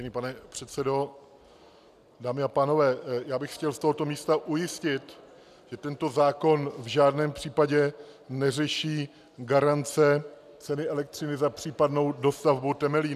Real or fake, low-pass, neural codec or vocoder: real; 14.4 kHz; none